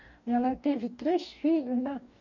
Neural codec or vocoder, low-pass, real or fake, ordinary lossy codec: codec, 44.1 kHz, 2.6 kbps, DAC; 7.2 kHz; fake; none